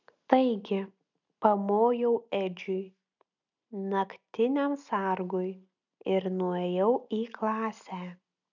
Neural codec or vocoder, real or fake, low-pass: none; real; 7.2 kHz